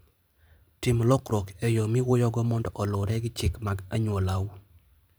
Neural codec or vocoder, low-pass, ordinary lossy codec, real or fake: vocoder, 44.1 kHz, 128 mel bands every 512 samples, BigVGAN v2; none; none; fake